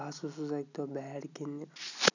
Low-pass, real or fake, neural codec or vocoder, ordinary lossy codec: 7.2 kHz; real; none; none